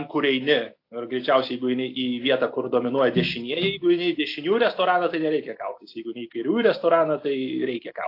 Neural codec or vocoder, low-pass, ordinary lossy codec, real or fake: none; 5.4 kHz; AAC, 32 kbps; real